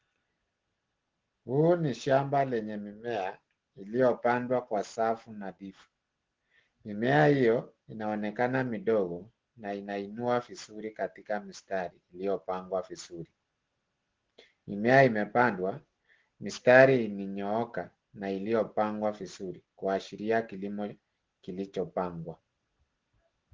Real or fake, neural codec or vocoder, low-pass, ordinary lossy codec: real; none; 7.2 kHz; Opus, 16 kbps